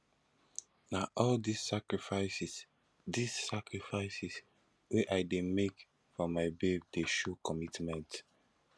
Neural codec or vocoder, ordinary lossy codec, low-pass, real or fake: none; none; none; real